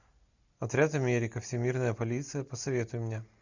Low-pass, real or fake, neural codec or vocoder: 7.2 kHz; real; none